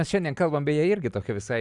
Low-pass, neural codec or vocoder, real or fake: 10.8 kHz; none; real